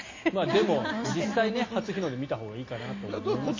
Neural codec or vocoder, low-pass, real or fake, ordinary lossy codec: none; 7.2 kHz; real; MP3, 32 kbps